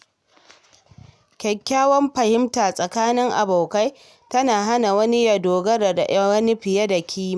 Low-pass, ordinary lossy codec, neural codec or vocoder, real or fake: none; none; none; real